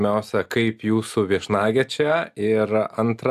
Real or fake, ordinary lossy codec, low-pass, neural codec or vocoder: real; AAC, 96 kbps; 14.4 kHz; none